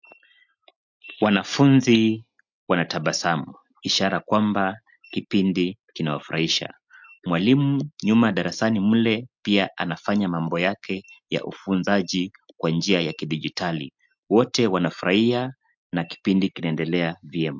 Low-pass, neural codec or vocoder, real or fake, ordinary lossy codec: 7.2 kHz; none; real; MP3, 64 kbps